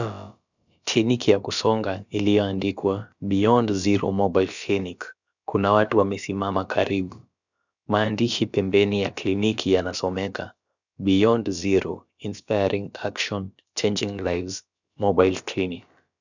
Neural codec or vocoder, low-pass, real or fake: codec, 16 kHz, about 1 kbps, DyCAST, with the encoder's durations; 7.2 kHz; fake